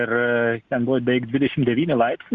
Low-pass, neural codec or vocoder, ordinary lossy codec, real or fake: 7.2 kHz; none; Opus, 64 kbps; real